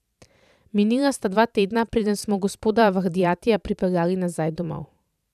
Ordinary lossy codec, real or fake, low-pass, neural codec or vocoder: none; fake; 14.4 kHz; vocoder, 44.1 kHz, 128 mel bands every 512 samples, BigVGAN v2